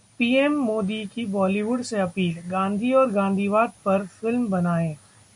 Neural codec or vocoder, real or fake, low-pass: none; real; 10.8 kHz